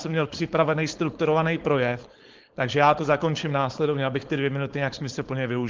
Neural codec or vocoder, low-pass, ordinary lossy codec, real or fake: codec, 16 kHz, 4.8 kbps, FACodec; 7.2 kHz; Opus, 16 kbps; fake